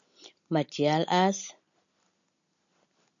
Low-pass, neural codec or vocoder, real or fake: 7.2 kHz; none; real